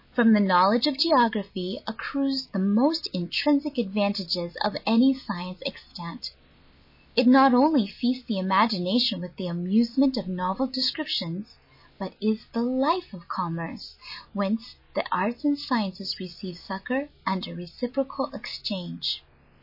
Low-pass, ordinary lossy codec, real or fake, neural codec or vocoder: 5.4 kHz; MP3, 24 kbps; real; none